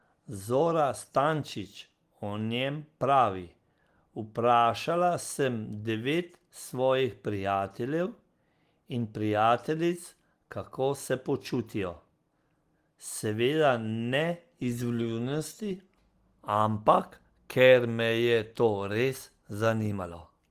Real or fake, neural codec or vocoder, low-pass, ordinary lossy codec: real; none; 14.4 kHz; Opus, 24 kbps